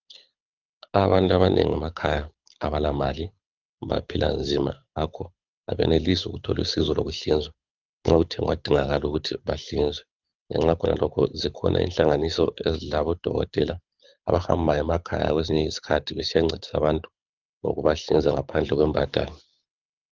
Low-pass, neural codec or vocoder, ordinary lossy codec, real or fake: 7.2 kHz; codec, 24 kHz, 6 kbps, HILCodec; Opus, 24 kbps; fake